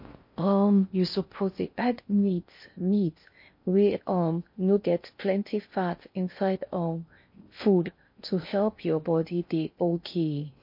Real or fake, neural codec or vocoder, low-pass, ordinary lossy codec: fake; codec, 16 kHz in and 24 kHz out, 0.6 kbps, FocalCodec, streaming, 4096 codes; 5.4 kHz; MP3, 32 kbps